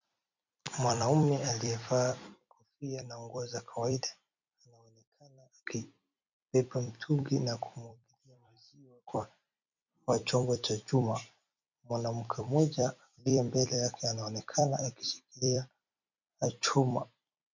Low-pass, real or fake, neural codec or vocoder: 7.2 kHz; fake; vocoder, 44.1 kHz, 128 mel bands every 256 samples, BigVGAN v2